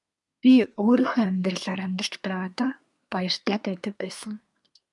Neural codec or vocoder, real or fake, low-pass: codec, 24 kHz, 1 kbps, SNAC; fake; 10.8 kHz